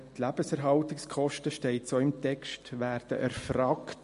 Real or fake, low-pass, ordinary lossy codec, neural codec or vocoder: real; 10.8 kHz; MP3, 48 kbps; none